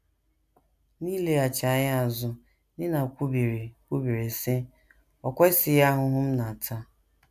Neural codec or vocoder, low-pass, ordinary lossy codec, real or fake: none; 14.4 kHz; none; real